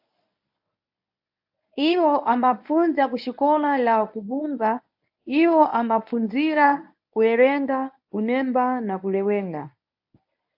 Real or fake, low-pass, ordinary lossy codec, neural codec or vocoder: fake; 5.4 kHz; AAC, 48 kbps; codec, 24 kHz, 0.9 kbps, WavTokenizer, medium speech release version 1